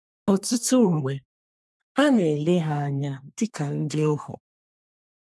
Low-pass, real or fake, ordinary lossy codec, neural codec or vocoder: none; fake; none; codec, 24 kHz, 1 kbps, SNAC